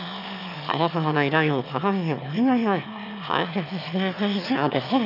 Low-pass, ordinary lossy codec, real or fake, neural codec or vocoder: 5.4 kHz; none; fake; autoencoder, 22.05 kHz, a latent of 192 numbers a frame, VITS, trained on one speaker